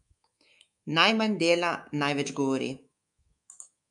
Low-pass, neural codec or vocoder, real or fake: 10.8 kHz; codec, 24 kHz, 3.1 kbps, DualCodec; fake